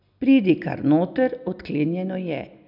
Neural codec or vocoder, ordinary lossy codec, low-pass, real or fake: none; none; 5.4 kHz; real